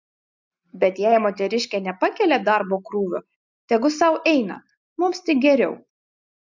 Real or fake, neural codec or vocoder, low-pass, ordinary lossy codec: real; none; 7.2 kHz; MP3, 64 kbps